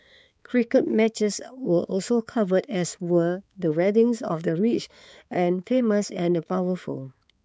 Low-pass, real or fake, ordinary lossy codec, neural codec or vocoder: none; fake; none; codec, 16 kHz, 4 kbps, X-Codec, HuBERT features, trained on balanced general audio